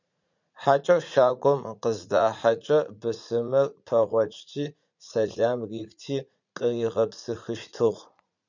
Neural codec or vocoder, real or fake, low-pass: vocoder, 44.1 kHz, 80 mel bands, Vocos; fake; 7.2 kHz